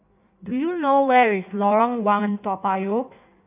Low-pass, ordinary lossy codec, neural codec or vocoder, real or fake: 3.6 kHz; none; codec, 16 kHz in and 24 kHz out, 1.1 kbps, FireRedTTS-2 codec; fake